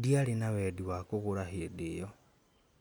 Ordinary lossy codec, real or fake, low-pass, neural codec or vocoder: none; real; none; none